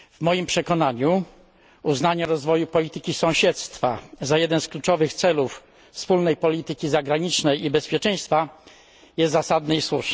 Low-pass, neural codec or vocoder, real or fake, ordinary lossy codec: none; none; real; none